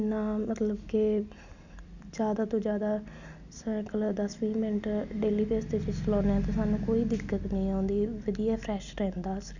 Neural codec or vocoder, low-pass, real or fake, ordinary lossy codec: none; 7.2 kHz; real; none